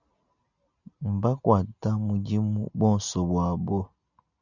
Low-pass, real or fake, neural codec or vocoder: 7.2 kHz; real; none